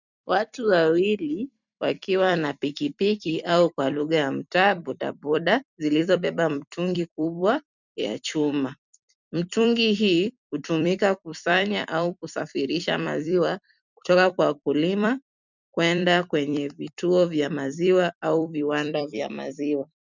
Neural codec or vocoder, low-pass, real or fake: vocoder, 44.1 kHz, 128 mel bands every 256 samples, BigVGAN v2; 7.2 kHz; fake